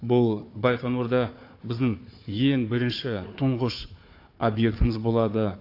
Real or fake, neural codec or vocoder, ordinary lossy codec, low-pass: fake; codec, 16 kHz, 4 kbps, FunCodec, trained on Chinese and English, 50 frames a second; AAC, 32 kbps; 5.4 kHz